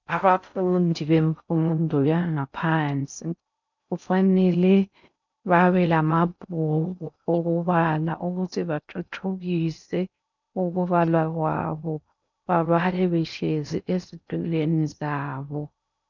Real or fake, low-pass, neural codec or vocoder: fake; 7.2 kHz; codec, 16 kHz in and 24 kHz out, 0.6 kbps, FocalCodec, streaming, 4096 codes